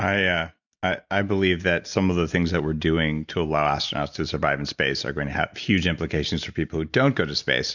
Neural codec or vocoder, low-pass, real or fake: none; 7.2 kHz; real